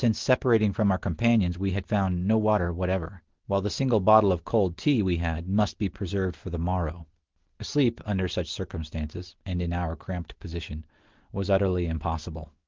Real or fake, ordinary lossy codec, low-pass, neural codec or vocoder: real; Opus, 16 kbps; 7.2 kHz; none